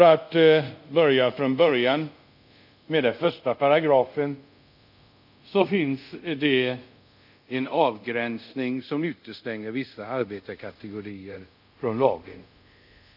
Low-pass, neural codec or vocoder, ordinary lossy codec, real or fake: 5.4 kHz; codec, 24 kHz, 0.5 kbps, DualCodec; none; fake